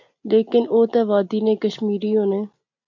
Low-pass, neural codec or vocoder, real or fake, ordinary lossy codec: 7.2 kHz; none; real; MP3, 48 kbps